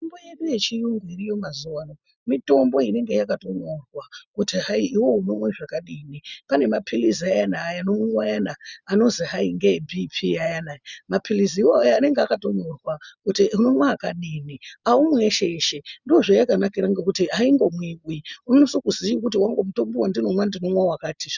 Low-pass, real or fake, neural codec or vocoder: 7.2 kHz; real; none